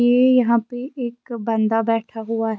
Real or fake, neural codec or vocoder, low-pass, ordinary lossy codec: real; none; none; none